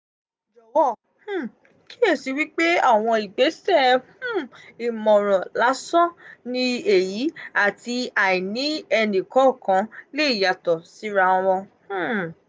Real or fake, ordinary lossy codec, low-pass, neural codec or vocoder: real; none; none; none